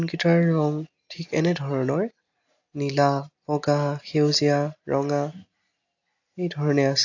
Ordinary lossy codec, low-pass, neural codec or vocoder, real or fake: AAC, 48 kbps; 7.2 kHz; none; real